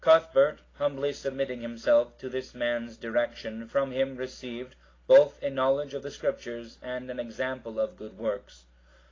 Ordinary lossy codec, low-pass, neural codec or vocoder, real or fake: AAC, 32 kbps; 7.2 kHz; none; real